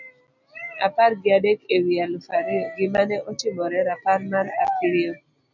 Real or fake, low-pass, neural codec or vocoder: real; 7.2 kHz; none